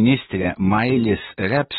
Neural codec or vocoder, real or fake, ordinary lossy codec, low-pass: vocoder, 44.1 kHz, 128 mel bands, Pupu-Vocoder; fake; AAC, 16 kbps; 19.8 kHz